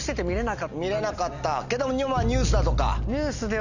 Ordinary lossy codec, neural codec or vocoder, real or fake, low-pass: none; none; real; 7.2 kHz